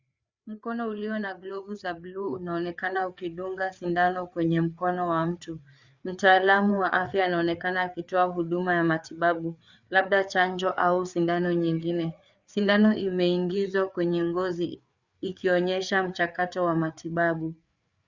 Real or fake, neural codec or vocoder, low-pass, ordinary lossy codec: fake; codec, 16 kHz, 4 kbps, FreqCodec, larger model; 7.2 kHz; Opus, 64 kbps